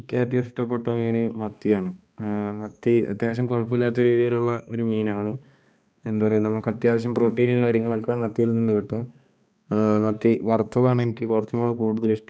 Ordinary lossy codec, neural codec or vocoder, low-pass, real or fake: none; codec, 16 kHz, 2 kbps, X-Codec, HuBERT features, trained on balanced general audio; none; fake